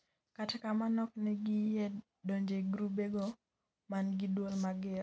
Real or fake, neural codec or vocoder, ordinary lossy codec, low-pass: real; none; none; none